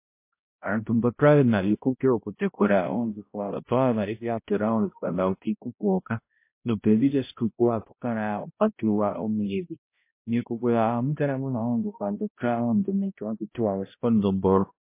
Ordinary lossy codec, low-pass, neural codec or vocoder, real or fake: MP3, 24 kbps; 3.6 kHz; codec, 16 kHz, 0.5 kbps, X-Codec, HuBERT features, trained on balanced general audio; fake